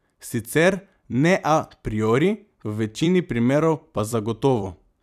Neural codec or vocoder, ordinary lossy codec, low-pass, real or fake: vocoder, 44.1 kHz, 128 mel bands every 256 samples, BigVGAN v2; none; 14.4 kHz; fake